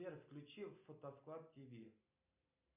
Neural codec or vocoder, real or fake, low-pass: none; real; 3.6 kHz